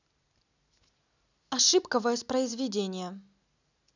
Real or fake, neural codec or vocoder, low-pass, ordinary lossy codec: real; none; 7.2 kHz; none